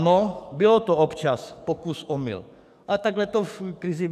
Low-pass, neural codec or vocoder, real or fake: 14.4 kHz; codec, 44.1 kHz, 7.8 kbps, DAC; fake